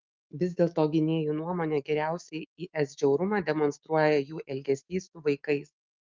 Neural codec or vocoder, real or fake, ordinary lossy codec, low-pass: autoencoder, 48 kHz, 128 numbers a frame, DAC-VAE, trained on Japanese speech; fake; Opus, 24 kbps; 7.2 kHz